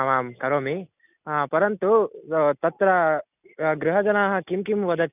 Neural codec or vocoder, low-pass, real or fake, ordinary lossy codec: none; 3.6 kHz; real; none